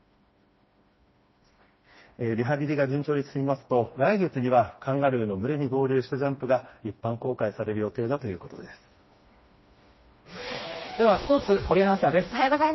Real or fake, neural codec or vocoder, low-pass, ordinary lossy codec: fake; codec, 16 kHz, 2 kbps, FreqCodec, smaller model; 7.2 kHz; MP3, 24 kbps